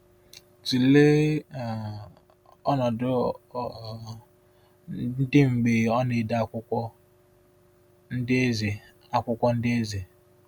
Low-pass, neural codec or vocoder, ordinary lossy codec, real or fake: 19.8 kHz; none; none; real